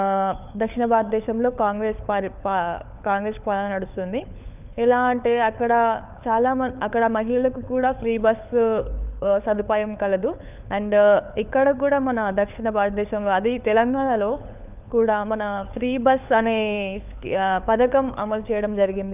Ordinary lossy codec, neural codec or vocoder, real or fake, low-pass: none; codec, 16 kHz, 4 kbps, FunCodec, trained on LibriTTS, 50 frames a second; fake; 3.6 kHz